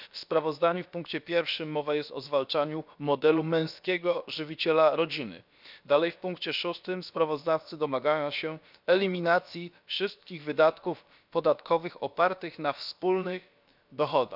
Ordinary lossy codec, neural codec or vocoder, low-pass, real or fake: none; codec, 16 kHz, about 1 kbps, DyCAST, with the encoder's durations; 5.4 kHz; fake